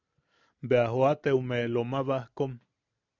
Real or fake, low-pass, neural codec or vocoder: real; 7.2 kHz; none